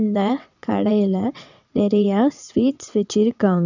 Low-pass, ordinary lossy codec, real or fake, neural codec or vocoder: 7.2 kHz; none; fake; vocoder, 22.05 kHz, 80 mel bands, WaveNeXt